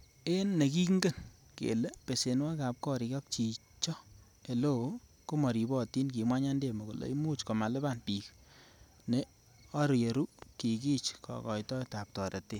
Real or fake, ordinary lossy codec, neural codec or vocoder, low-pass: real; none; none; 19.8 kHz